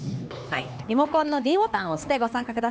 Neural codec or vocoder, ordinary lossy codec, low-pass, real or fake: codec, 16 kHz, 2 kbps, X-Codec, HuBERT features, trained on LibriSpeech; none; none; fake